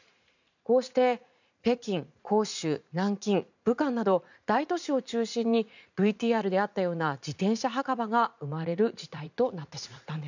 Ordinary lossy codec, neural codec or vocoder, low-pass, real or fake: none; vocoder, 44.1 kHz, 80 mel bands, Vocos; 7.2 kHz; fake